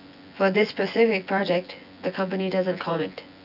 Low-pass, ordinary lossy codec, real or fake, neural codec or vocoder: 5.4 kHz; none; fake; vocoder, 24 kHz, 100 mel bands, Vocos